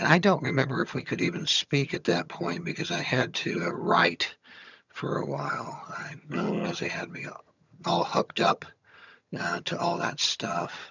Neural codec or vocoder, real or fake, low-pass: vocoder, 22.05 kHz, 80 mel bands, HiFi-GAN; fake; 7.2 kHz